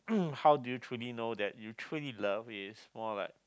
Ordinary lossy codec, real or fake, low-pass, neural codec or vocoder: none; real; none; none